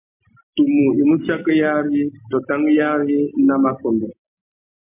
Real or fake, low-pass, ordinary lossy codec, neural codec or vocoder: real; 3.6 kHz; MP3, 32 kbps; none